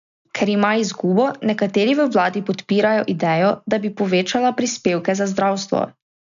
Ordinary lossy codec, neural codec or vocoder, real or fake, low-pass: none; none; real; 7.2 kHz